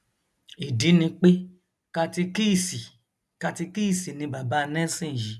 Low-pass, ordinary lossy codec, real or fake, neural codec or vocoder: none; none; real; none